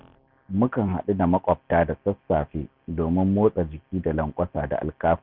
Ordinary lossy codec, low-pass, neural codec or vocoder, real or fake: none; 5.4 kHz; none; real